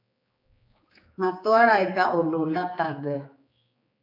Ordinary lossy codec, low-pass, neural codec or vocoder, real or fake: AAC, 32 kbps; 5.4 kHz; codec, 16 kHz, 4 kbps, X-Codec, HuBERT features, trained on general audio; fake